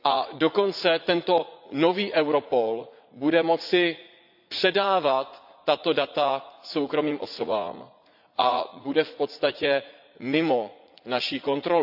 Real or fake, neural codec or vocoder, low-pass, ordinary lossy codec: fake; vocoder, 44.1 kHz, 80 mel bands, Vocos; 5.4 kHz; AAC, 48 kbps